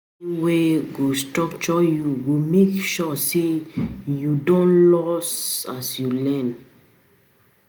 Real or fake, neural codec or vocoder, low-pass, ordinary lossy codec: real; none; none; none